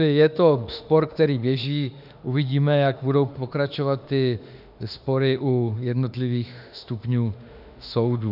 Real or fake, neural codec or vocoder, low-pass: fake; autoencoder, 48 kHz, 32 numbers a frame, DAC-VAE, trained on Japanese speech; 5.4 kHz